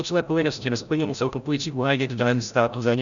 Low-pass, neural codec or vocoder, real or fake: 7.2 kHz; codec, 16 kHz, 0.5 kbps, FreqCodec, larger model; fake